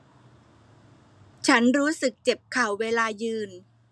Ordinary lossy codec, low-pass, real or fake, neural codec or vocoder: none; none; real; none